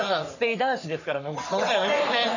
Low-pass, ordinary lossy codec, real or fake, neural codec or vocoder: 7.2 kHz; none; fake; codec, 44.1 kHz, 3.4 kbps, Pupu-Codec